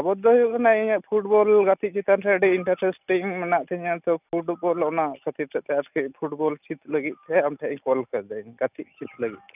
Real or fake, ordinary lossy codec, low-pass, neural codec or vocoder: real; none; 3.6 kHz; none